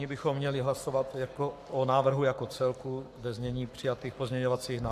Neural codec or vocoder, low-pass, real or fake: codec, 44.1 kHz, 7.8 kbps, Pupu-Codec; 14.4 kHz; fake